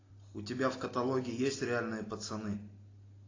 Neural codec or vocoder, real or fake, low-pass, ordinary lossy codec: none; real; 7.2 kHz; AAC, 32 kbps